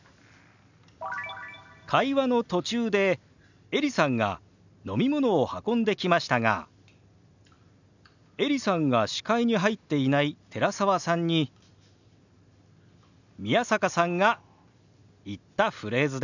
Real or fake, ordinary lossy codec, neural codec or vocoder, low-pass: real; none; none; 7.2 kHz